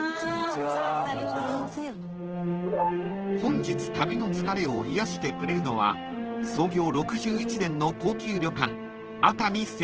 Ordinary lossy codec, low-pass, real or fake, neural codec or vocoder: Opus, 16 kbps; 7.2 kHz; fake; codec, 16 kHz in and 24 kHz out, 1 kbps, XY-Tokenizer